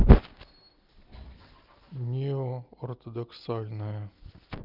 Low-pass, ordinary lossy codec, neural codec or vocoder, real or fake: 5.4 kHz; Opus, 32 kbps; none; real